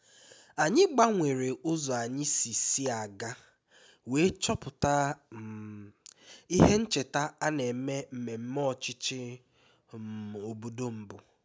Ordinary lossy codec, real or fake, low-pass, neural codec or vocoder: none; real; none; none